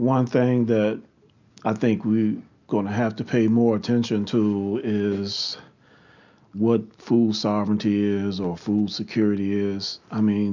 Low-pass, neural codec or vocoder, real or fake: 7.2 kHz; none; real